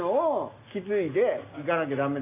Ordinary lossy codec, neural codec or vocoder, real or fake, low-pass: MP3, 32 kbps; none; real; 3.6 kHz